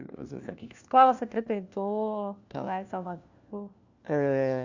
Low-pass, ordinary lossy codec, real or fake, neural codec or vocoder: 7.2 kHz; Opus, 64 kbps; fake; codec, 16 kHz, 1 kbps, FunCodec, trained on LibriTTS, 50 frames a second